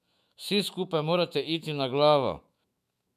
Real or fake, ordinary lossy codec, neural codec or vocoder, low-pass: fake; none; autoencoder, 48 kHz, 128 numbers a frame, DAC-VAE, trained on Japanese speech; 14.4 kHz